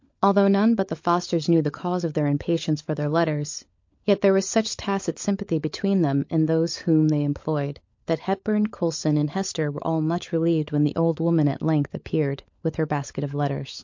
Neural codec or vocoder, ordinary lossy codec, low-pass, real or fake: codec, 16 kHz, 16 kbps, FunCodec, trained on LibriTTS, 50 frames a second; MP3, 48 kbps; 7.2 kHz; fake